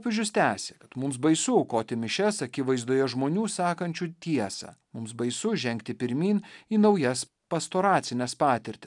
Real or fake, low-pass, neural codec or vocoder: real; 10.8 kHz; none